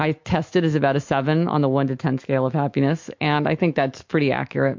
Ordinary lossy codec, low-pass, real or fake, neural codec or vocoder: MP3, 48 kbps; 7.2 kHz; fake; autoencoder, 48 kHz, 128 numbers a frame, DAC-VAE, trained on Japanese speech